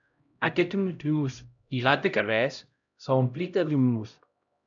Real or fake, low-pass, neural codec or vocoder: fake; 7.2 kHz; codec, 16 kHz, 0.5 kbps, X-Codec, HuBERT features, trained on LibriSpeech